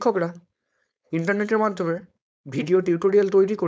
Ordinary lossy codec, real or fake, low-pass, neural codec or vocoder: none; fake; none; codec, 16 kHz, 4.8 kbps, FACodec